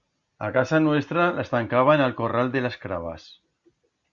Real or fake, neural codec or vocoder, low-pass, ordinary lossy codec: real; none; 7.2 kHz; Opus, 64 kbps